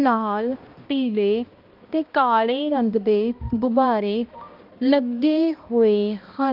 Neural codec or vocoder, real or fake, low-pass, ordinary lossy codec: codec, 16 kHz, 1 kbps, X-Codec, HuBERT features, trained on balanced general audio; fake; 5.4 kHz; Opus, 24 kbps